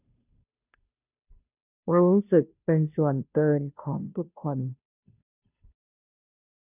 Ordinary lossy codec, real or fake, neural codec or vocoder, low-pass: none; fake; codec, 16 kHz, 0.5 kbps, FunCodec, trained on Chinese and English, 25 frames a second; 3.6 kHz